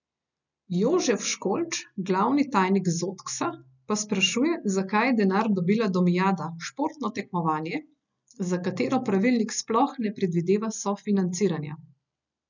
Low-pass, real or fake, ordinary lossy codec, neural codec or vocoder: 7.2 kHz; real; none; none